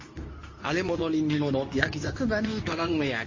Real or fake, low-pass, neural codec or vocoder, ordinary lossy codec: fake; 7.2 kHz; codec, 24 kHz, 0.9 kbps, WavTokenizer, medium speech release version 2; MP3, 32 kbps